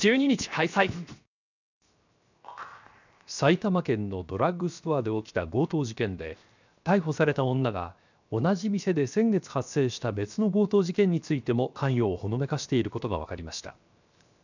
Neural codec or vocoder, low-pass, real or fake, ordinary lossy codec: codec, 16 kHz, 0.7 kbps, FocalCodec; 7.2 kHz; fake; none